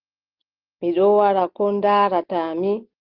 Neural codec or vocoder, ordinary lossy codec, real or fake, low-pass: none; Opus, 16 kbps; real; 5.4 kHz